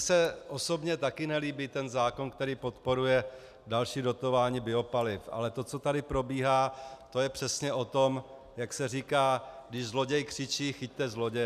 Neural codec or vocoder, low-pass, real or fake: none; 14.4 kHz; real